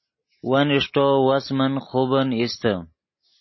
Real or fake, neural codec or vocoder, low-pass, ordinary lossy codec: real; none; 7.2 kHz; MP3, 24 kbps